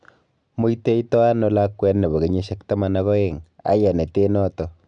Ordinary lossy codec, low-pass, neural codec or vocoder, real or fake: none; 9.9 kHz; none; real